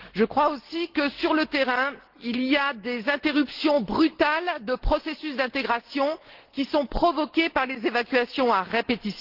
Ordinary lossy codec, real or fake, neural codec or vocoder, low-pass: Opus, 16 kbps; real; none; 5.4 kHz